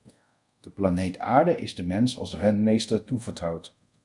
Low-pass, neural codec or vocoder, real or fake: 10.8 kHz; codec, 24 kHz, 0.5 kbps, DualCodec; fake